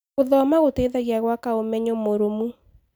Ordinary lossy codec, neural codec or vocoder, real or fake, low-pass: none; none; real; none